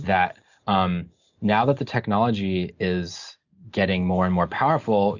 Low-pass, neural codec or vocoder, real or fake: 7.2 kHz; none; real